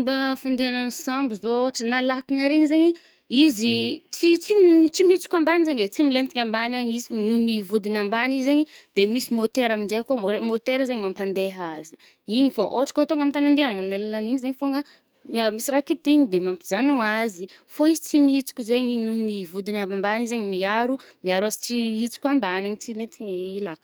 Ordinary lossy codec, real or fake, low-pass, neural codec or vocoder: none; fake; none; codec, 44.1 kHz, 2.6 kbps, SNAC